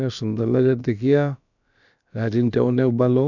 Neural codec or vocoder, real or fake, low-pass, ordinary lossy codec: codec, 16 kHz, about 1 kbps, DyCAST, with the encoder's durations; fake; 7.2 kHz; none